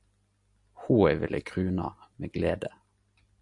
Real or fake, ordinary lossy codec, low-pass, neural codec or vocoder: real; MP3, 64 kbps; 10.8 kHz; none